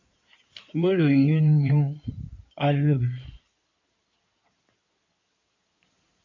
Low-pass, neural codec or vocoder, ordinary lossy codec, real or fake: 7.2 kHz; codec, 16 kHz in and 24 kHz out, 2.2 kbps, FireRedTTS-2 codec; MP3, 48 kbps; fake